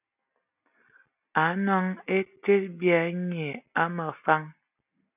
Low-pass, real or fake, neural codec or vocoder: 3.6 kHz; real; none